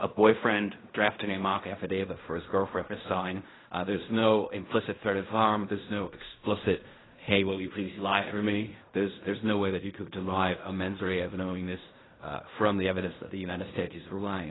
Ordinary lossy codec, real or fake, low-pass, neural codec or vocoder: AAC, 16 kbps; fake; 7.2 kHz; codec, 16 kHz in and 24 kHz out, 0.4 kbps, LongCat-Audio-Codec, fine tuned four codebook decoder